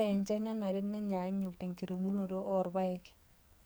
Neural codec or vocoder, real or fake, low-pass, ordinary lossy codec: codec, 44.1 kHz, 2.6 kbps, SNAC; fake; none; none